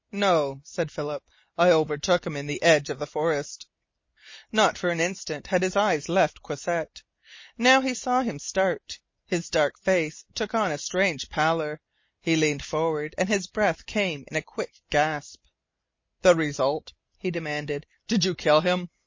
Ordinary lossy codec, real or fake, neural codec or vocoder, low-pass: MP3, 32 kbps; real; none; 7.2 kHz